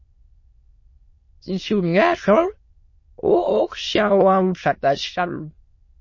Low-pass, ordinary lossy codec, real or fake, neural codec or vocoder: 7.2 kHz; MP3, 32 kbps; fake; autoencoder, 22.05 kHz, a latent of 192 numbers a frame, VITS, trained on many speakers